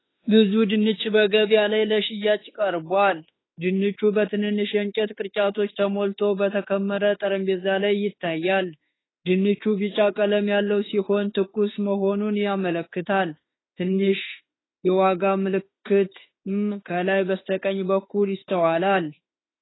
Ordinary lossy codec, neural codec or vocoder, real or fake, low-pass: AAC, 16 kbps; autoencoder, 48 kHz, 32 numbers a frame, DAC-VAE, trained on Japanese speech; fake; 7.2 kHz